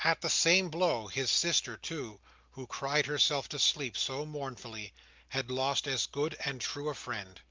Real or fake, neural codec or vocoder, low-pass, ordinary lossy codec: real; none; 7.2 kHz; Opus, 24 kbps